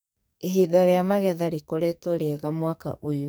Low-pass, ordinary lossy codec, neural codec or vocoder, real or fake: none; none; codec, 44.1 kHz, 2.6 kbps, SNAC; fake